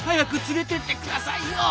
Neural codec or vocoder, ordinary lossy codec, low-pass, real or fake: none; none; none; real